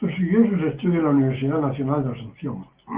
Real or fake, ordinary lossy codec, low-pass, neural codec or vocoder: real; Opus, 16 kbps; 3.6 kHz; none